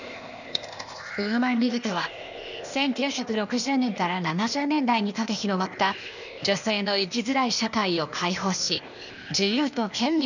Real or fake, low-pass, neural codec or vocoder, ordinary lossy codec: fake; 7.2 kHz; codec, 16 kHz, 0.8 kbps, ZipCodec; none